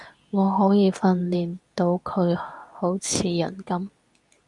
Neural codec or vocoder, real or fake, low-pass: codec, 24 kHz, 0.9 kbps, WavTokenizer, medium speech release version 2; fake; 10.8 kHz